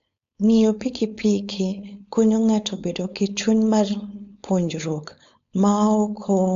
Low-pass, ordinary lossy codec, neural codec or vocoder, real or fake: 7.2 kHz; AAC, 64 kbps; codec, 16 kHz, 4.8 kbps, FACodec; fake